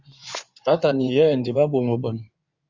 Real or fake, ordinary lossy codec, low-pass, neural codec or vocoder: fake; Opus, 64 kbps; 7.2 kHz; codec, 16 kHz in and 24 kHz out, 2.2 kbps, FireRedTTS-2 codec